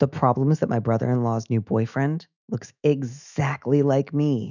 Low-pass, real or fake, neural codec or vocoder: 7.2 kHz; fake; autoencoder, 48 kHz, 128 numbers a frame, DAC-VAE, trained on Japanese speech